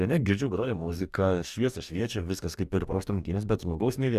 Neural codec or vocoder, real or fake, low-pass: codec, 44.1 kHz, 2.6 kbps, DAC; fake; 14.4 kHz